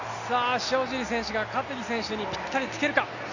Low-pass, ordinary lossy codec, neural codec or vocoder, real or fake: 7.2 kHz; none; none; real